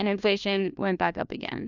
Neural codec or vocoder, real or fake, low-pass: codec, 16 kHz, 1 kbps, FunCodec, trained on LibriTTS, 50 frames a second; fake; 7.2 kHz